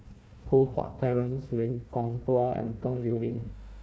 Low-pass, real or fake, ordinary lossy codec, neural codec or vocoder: none; fake; none; codec, 16 kHz, 1 kbps, FunCodec, trained on Chinese and English, 50 frames a second